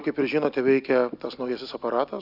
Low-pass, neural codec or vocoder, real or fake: 5.4 kHz; none; real